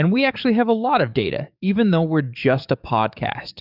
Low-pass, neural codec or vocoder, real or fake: 5.4 kHz; codec, 44.1 kHz, 7.8 kbps, DAC; fake